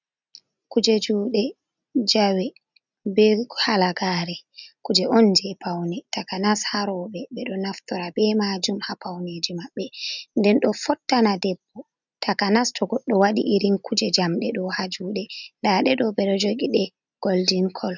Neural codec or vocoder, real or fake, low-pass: none; real; 7.2 kHz